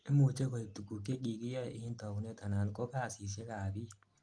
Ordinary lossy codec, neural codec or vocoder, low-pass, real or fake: Opus, 32 kbps; none; 9.9 kHz; real